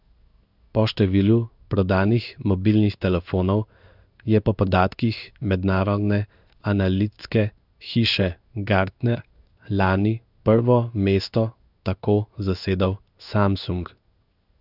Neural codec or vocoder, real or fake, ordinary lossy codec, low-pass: codec, 16 kHz in and 24 kHz out, 1 kbps, XY-Tokenizer; fake; none; 5.4 kHz